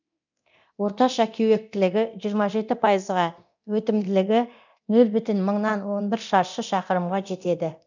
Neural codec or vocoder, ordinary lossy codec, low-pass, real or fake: codec, 24 kHz, 0.9 kbps, DualCodec; none; 7.2 kHz; fake